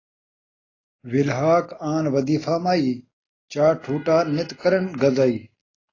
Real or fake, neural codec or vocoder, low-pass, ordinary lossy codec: real; none; 7.2 kHz; AAC, 32 kbps